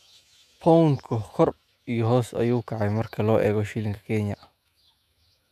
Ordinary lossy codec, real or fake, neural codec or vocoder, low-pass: none; fake; autoencoder, 48 kHz, 128 numbers a frame, DAC-VAE, trained on Japanese speech; 14.4 kHz